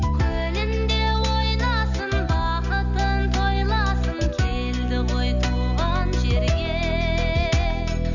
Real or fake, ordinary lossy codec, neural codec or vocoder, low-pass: real; none; none; 7.2 kHz